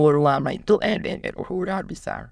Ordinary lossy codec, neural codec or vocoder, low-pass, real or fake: none; autoencoder, 22.05 kHz, a latent of 192 numbers a frame, VITS, trained on many speakers; none; fake